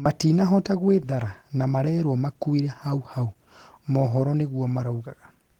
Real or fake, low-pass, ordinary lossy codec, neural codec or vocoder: real; 19.8 kHz; Opus, 16 kbps; none